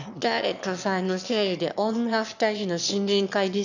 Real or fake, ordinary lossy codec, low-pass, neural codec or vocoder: fake; none; 7.2 kHz; autoencoder, 22.05 kHz, a latent of 192 numbers a frame, VITS, trained on one speaker